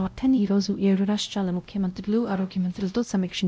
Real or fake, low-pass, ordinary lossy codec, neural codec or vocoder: fake; none; none; codec, 16 kHz, 0.5 kbps, X-Codec, WavLM features, trained on Multilingual LibriSpeech